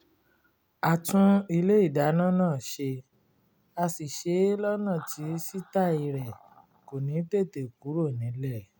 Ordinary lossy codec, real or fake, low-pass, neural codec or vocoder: none; real; none; none